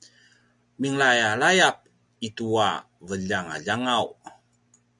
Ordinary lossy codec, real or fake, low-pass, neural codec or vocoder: MP3, 96 kbps; real; 10.8 kHz; none